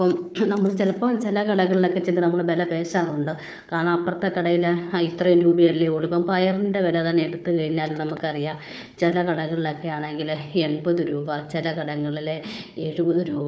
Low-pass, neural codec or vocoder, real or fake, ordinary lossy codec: none; codec, 16 kHz, 4 kbps, FunCodec, trained on Chinese and English, 50 frames a second; fake; none